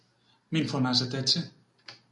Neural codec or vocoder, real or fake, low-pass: none; real; 10.8 kHz